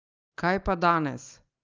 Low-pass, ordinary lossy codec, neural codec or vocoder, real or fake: 7.2 kHz; Opus, 32 kbps; none; real